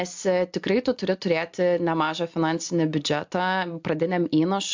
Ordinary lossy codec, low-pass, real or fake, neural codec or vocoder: MP3, 64 kbps; 7.2 kHz; real; none